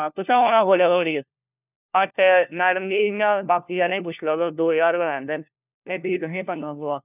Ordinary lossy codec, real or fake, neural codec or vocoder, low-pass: none; fake; codec, 16 kHz, 1 kbps, FunCodec, trained on LibriTTS, 50 frames a second; 3.6 kHz